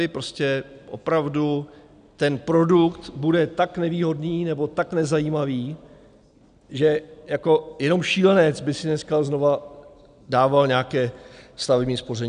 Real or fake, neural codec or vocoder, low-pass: real; none; 9.9 kHz